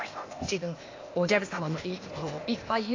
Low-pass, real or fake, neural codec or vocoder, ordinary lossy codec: 7.2 kHz; fake; codec, 16 kHz, 0.8 kbps, ZipCodec; AAC, 32 kbps